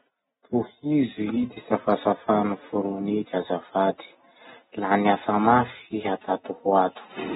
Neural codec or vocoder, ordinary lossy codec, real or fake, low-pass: none; AAC, 16 kbps; real; 19.8 kHz